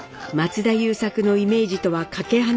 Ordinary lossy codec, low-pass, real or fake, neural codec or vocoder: none; none; real; none